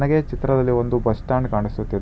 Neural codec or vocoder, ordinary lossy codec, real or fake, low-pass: none; none; real; none